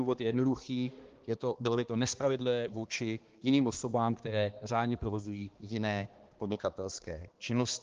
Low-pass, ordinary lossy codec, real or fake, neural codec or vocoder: 7.2 kHz; Opus, 16 kbps; fake; codec, 16 kHz, 2 kbps, X-Codec, HuBERT features, trained on balanced general audio